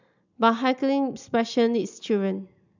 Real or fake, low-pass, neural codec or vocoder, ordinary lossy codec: real; 7.2 kHz; none; none